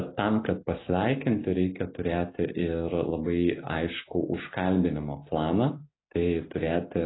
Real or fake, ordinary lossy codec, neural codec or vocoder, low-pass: real; AAC, 16 kbps; none; 7.2 kHz